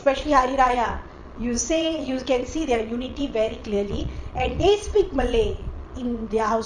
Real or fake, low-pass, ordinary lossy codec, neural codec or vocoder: fake; 9.9 kHz; none; vocoder, 22.05 kHz, 80 mel bands, Vocos